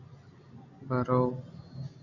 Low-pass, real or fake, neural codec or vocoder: 7.2 kHz; real; none